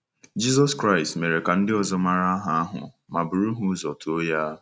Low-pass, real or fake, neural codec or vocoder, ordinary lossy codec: none; real; none; none